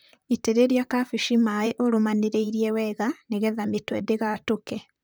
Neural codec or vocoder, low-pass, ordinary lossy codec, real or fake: vocoder, 44.1 kHz, 128 mel bands, Pupu-Vocoder; none; none; fake